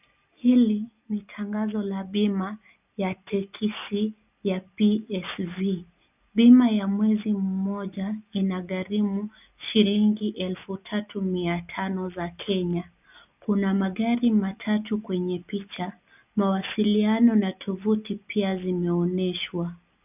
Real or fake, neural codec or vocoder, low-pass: real; none; 3.6 kHz